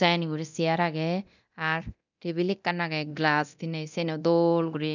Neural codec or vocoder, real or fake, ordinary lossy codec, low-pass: codec, 24 kHz, 0.9 kbps, DualCodec; fake; none; 7.2 kHz